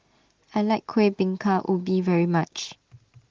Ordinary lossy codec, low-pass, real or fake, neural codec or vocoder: Opus, 16 kbps; 7.2 kHz; real; none